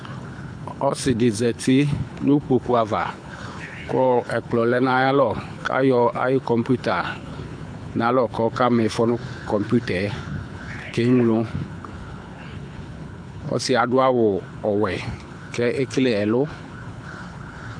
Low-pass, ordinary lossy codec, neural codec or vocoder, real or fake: 9.9 kHz; AAC, 64 kbps; codec, 24 kHz, 6 kbps, HILCodec; fake